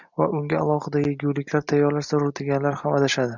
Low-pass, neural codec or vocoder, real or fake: 7.2 kHz; none; real